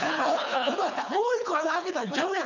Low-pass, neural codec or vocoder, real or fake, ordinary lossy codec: 7.2 kHz; codec, 24 kHz, 3 kbps, HILCodec; fake; none